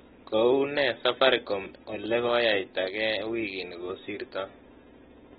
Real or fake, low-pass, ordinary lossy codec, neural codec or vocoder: real; 7.2 kHz; AAC, 16 kbps; none